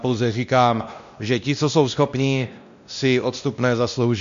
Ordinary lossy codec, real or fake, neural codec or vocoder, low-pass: MP3, 64 kbps; fake; codec, 16 kHz, 1 kbps, X-Codec, WavLM features, trained on Multilingual LibriSpeech; 7.2 kHz